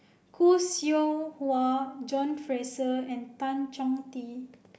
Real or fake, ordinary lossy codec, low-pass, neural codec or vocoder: real; none; none; none